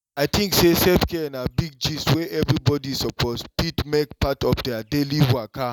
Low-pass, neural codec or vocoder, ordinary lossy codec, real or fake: 19.8 kHz; none; none; real